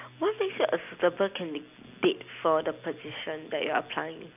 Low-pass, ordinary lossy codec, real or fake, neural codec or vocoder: 3.6 kHz; none; real; none